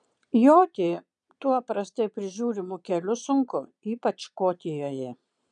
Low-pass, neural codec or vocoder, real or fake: 9.9 kHz; vocoder, 22.05 kHz, 80 mel bands, Vocos; fake